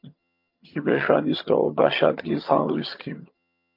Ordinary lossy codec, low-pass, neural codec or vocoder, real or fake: MP3, 32 kbps; 5.4 kHz; vocoder, 22.05 kHz, 80 mel bands, HiFi-GAN; fake